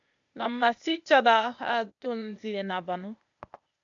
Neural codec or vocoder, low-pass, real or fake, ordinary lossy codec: codec, 16 kHz, 0.8 kbps, ZipCodec; 7.2 kHz; fake; AAC, 64 kbps